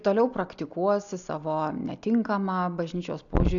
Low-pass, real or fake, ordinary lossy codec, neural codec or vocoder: 7.2 kHz; real; MP3, 96 kbps; none